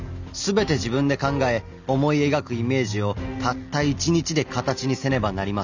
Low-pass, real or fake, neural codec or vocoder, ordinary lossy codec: 7.2 kHz; real; none; none